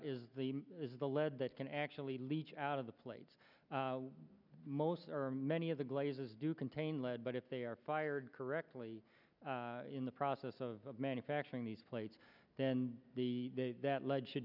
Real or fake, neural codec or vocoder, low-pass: real; none; 5.4 kHz